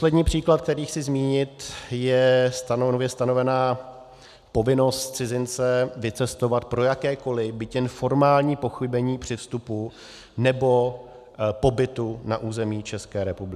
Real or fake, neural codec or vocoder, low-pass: real; none; 14.4 kHz